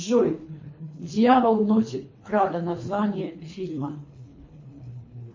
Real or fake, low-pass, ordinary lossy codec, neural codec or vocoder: fake; 7.2 kHz; MP3, 32 kbps; codec, 24 kHz, 3 kbps, HILCodec